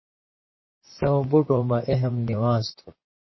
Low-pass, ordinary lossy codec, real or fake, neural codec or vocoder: 7.2 kHz; MP3, 24 kbps; fake; vocoder, 44.1 kHz, 128 mel bands, Pupu-Vocoder